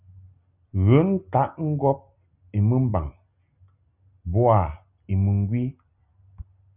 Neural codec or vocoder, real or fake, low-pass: none; real; 3.6 kHz